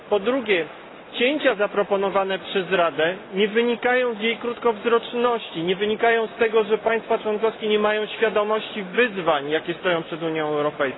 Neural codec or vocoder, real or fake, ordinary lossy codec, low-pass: none; real; AAC, 16 kbps; 7.2 kHz